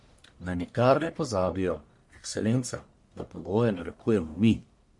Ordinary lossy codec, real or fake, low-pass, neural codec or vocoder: MP3, 48 kbps; fake; 10.8 kHz; codec, 44.1 kHz, 1.7 kbps, Pupu-Codec